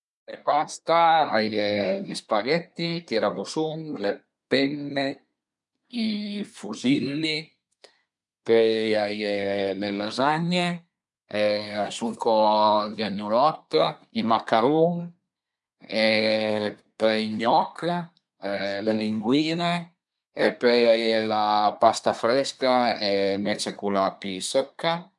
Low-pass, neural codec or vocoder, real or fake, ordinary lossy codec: 10.8 kHz; codec, 24 kHz, 1 kbps, SNAC; fake; none